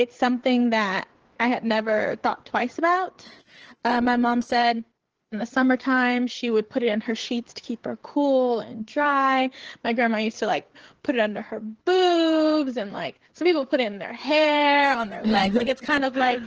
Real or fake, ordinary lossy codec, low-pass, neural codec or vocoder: fake; Opus, 16 kbps; 7.2 kHz; codec, 16 kHz, 4 kbps, FreqCodec, larger model